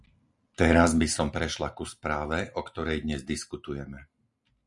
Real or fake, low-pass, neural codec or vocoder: real; 10.8 kHz; none